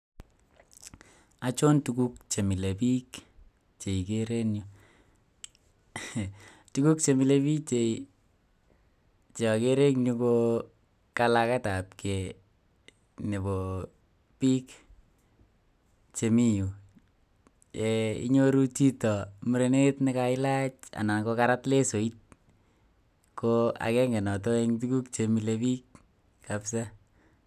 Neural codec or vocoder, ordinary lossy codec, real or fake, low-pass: none; none; real; 14.4 kHz